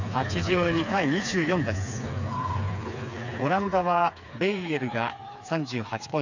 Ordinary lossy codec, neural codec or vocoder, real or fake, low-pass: none; codec, 16 kHz, 4 kbps, FreqCodec, smaller model; fake; 7.2 kHz